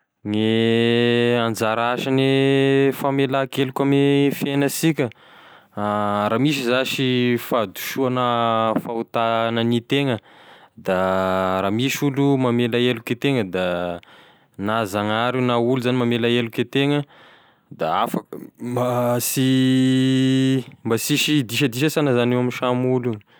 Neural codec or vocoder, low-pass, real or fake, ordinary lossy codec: none; none; real; none